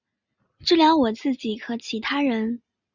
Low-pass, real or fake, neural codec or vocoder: 7.2 kHz; real; none